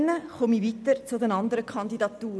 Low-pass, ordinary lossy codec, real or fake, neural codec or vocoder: none; none; real; none